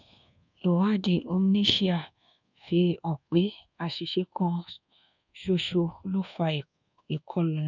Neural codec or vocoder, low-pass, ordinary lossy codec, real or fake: codec, 24 kHz, 1.2 kbps, DualCodec; 7.2 kHz; none; fake